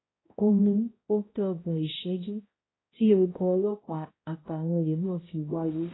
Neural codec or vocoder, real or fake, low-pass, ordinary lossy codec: codec, 16 kHz, 0.5 kbps, X-Codec, HuBERT features, trained on balanced general audio; fake; 7.2 kHz; AAC, 16 kbps